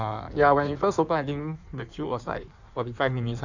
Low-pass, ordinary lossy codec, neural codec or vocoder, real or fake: 7.2 kHz; none; codec, 16 kHz in and 24 kHz out, 1.1 kbps, FireRedTTS-2 codec; fake